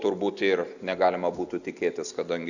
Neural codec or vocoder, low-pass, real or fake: none; 7.2 kHz; real